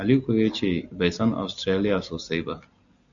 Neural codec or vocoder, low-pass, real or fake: none; 7.2 kHz; real